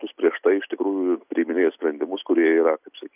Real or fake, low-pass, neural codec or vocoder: real; 3.6 kHz; none